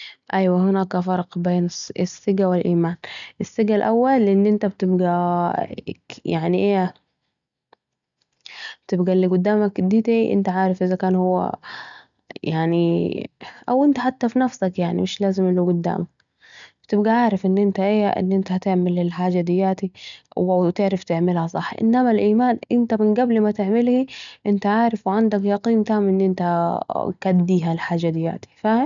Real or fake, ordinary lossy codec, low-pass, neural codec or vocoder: real; none; 7.2 kHz; none